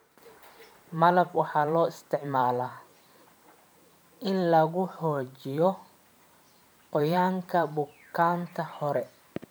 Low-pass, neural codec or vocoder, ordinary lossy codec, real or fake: none; vocoder, 44.1 kHz, 128 mel bands every 512 samples, BigVGAN v2; none; fake